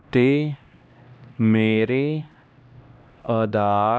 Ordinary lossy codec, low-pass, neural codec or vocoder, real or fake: none; none; codec, 16 kHz, 1 kbps, X-Codec, HuBERT features, trained on LibriSpeech; fake